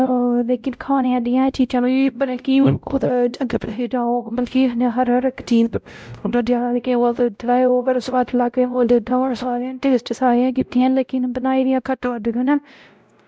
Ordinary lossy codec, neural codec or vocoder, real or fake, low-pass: none; codec, 16 kHz, 0.5 kbps, X-Codec, WavLM features, trained on Multilingual LibriSpeech; fake; none